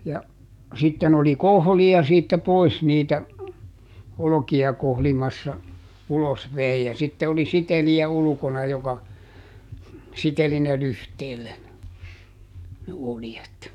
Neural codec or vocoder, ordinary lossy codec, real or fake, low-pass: codec, 44.1 kHz, 7.8 kbps, DAC; none; fake; 19.8 kHz